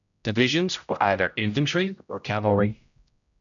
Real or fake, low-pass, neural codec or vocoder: fake; 7.2 kHz; codec, 16 kHz, 0.5 kbps, X-Codec, HuBERT features, trained on general audio